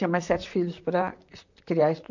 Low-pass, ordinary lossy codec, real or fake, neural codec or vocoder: 7.2 kHz; none; fake; vocoder, 44.1 kHz, 128 mel bands, Pupu-Vocoder